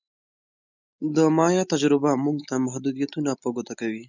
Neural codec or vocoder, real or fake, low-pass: none; real; 7.2 kHz